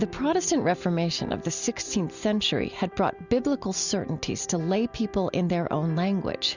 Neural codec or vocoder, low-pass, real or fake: none; 7.2 kHz; real